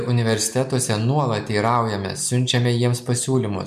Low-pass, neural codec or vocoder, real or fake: 14.4 kHz; none; real